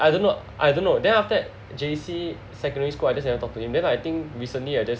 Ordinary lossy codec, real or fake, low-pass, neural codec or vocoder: none; real; none; none